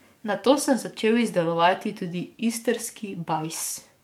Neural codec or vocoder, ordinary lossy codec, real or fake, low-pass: codec, 44.1 kHz, 7.8 kbps, DAC; MP3, 96 kbps; fake; 19.8 kHz